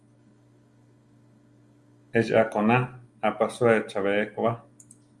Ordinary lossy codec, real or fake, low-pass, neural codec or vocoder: Opus, 32 kbps; real; 10.8 kHz; none